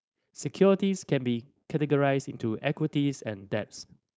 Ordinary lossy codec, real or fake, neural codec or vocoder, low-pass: none; fake; codec, 16 kHz, 4.8 kbps, FACodec; none